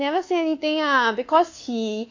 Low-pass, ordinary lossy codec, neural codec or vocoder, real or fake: 7.2 kHz; AAC, 48 kbps; codec, 24 kHz, 1.2 kbps, DualCodec; fake